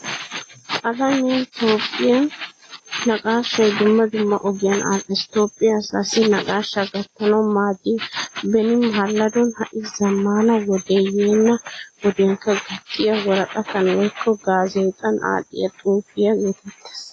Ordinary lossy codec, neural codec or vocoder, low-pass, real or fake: AAC, 32 kbps; none; 9.9 kHz; real